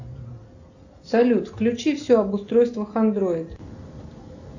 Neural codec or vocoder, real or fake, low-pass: none; real; 7.2 kHz